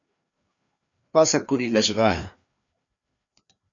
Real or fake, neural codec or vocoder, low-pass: fake; codec, 16 kHz, 2 kbps, FreqCodec, larger model; 7.2 kHz